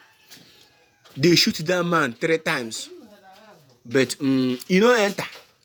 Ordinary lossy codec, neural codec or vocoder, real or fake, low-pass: none; vocoder, 48 kHz, 128 mel bands, Vocos; fake; none